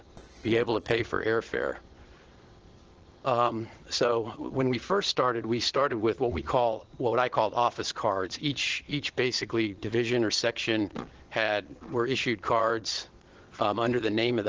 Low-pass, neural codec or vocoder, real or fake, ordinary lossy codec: 7.2 kHz; vocoder, 22.05 kHz, 80 mel bands, Vocos; fake; Opus, 16 kbps